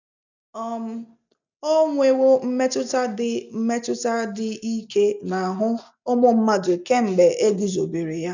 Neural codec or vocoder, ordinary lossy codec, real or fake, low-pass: none; none; real; 7.2 kHz